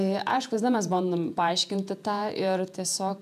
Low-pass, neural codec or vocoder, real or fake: 14.4 kHz; vocoder, 48 kHz, 128 mel bands, Vocos; fake